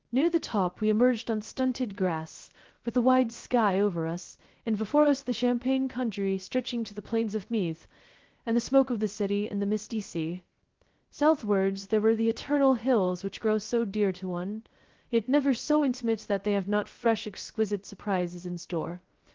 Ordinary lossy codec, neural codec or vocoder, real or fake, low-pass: Opus, 16 kbps; codec, 16 kHz, 0.3 kbps, FocalCodec; fake; 7.2 kHz